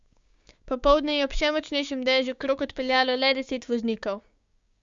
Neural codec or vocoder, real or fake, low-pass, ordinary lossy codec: codec, 16 kHz, 6 kbps, DAC; fake; 7.2 kHz; Opus, 64 kbps